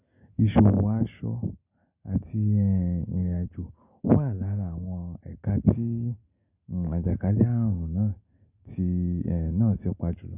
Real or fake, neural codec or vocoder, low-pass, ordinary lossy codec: real; none; 3.6 kHz; none